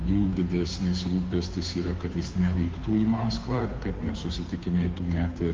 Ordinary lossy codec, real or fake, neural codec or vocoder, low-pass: Opus, 32 kbps; fake; codec, 16 kHz, 2 kbps, FunCodec, trained on Chinese and English, 25 frames a second; 7.2 kHz